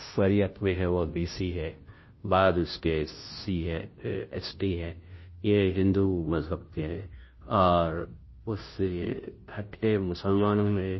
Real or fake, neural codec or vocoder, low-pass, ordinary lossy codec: fake; codec, 16 kHz, 0.5 kbps, FunCodec, trained on Chinese and English, 25 frames a second; 7.2 kHz; MP3, 24 kbps